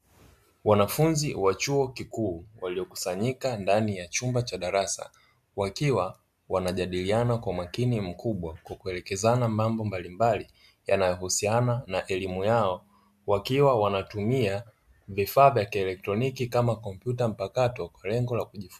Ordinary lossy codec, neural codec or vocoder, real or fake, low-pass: MP3, 96 kbps; none; real; 14.4 kHz